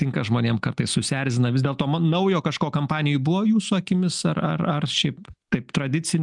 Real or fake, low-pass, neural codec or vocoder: real; 10.8 kHz; none